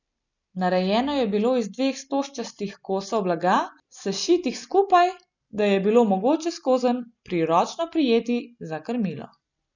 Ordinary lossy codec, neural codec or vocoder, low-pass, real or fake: none; none; 7.2 kHz; real